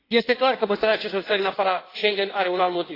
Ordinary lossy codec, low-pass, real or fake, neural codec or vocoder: AAC, 24 kbps; 5.4 kHz; fake; codec, 16 kHz in and 24 kHz out, 1.1 kbps, FireRedTTS-2 codec